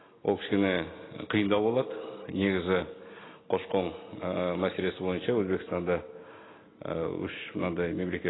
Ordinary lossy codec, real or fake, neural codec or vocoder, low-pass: AAC, 16 kbps; real; none; 7.2 kHz